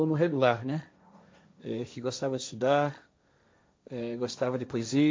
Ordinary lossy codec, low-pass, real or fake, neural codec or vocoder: none; none; fake; codec, 16 kHz, 1.1 kbps, Voila-Tokenizer